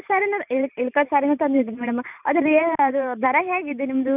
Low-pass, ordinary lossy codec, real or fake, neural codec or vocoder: 3.6 kHz; none; fake; vocoder, 44.1 kHz, 128 mel bands every 512 samples, BigVGAN v2